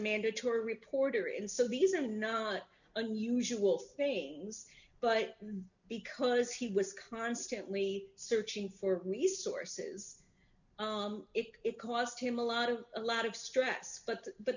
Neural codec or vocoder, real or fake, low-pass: none; real; 7.2 kHz